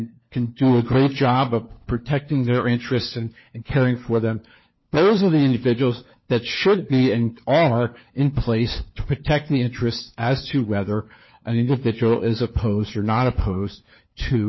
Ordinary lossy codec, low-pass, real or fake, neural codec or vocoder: MP3, 24 kbps; 7.2 kHz; fake; codec, 16 kHz, 4 kbps, FunCodec, trained on LibriTTS, 50 frames a second